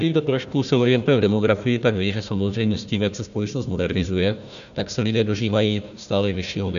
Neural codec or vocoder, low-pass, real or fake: codec, 16 kHz, 1 kbps, FunCodec, trained on Chinese and English, 50 frames a second; 7.2 kHz; fake